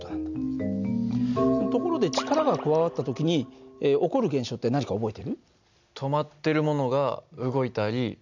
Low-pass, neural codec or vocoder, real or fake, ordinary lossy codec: 7.2 kHz; none; real; none